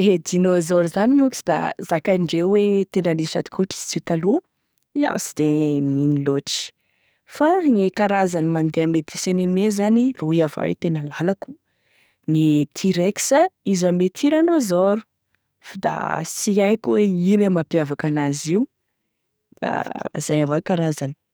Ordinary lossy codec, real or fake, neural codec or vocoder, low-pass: none; fake; codec, 44.1 kHz, 2.6 kbps, SNAC; none